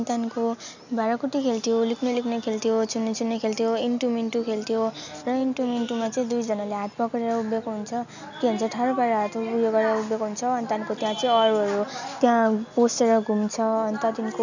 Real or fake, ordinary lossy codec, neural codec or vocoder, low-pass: real; none; none; 7.2 kHz